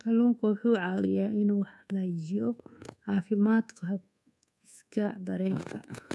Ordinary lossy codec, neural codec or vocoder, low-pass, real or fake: none; codec, 24 kHz, 1.2 kbps, DualCodec; none; fake